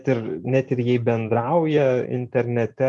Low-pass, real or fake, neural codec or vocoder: 10.8 kHz; real; none